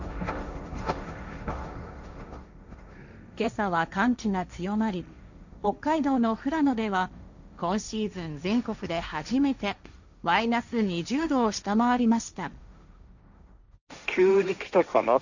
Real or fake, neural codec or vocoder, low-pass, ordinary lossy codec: fake; codec, 16 kHz, 1.1 kbps, Voila-Tokenizer; 7.2 kHz; none